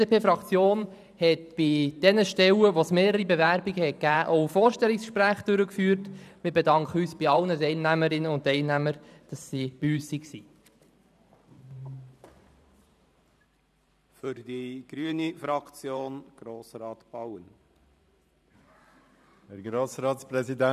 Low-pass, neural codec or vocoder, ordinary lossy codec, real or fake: 14.4 kHz; vocoder, 44.1 kHz, 128 mel bands every 512 samples, BigVGAN v2; none; fake